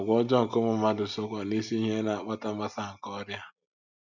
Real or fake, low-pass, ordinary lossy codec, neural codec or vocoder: real; 7.2 kHz; none; none